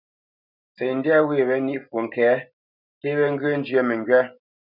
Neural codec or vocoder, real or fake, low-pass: none; real; 5.4 kHz